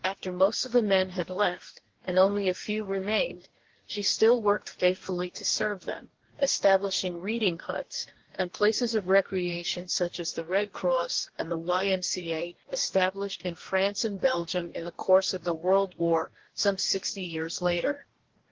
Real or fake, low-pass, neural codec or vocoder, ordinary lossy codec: fake; 7.2 kHz; codec, 44.1 kHz, 2.6 kbps, DAC; Opus, 16 kbps